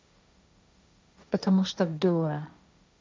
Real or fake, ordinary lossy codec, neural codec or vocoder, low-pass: fake; none; codec, 16 kHz, 1.1 kbps, Voila-Tokenizer; none